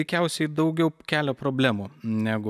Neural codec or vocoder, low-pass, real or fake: none; 14.4 kHz; real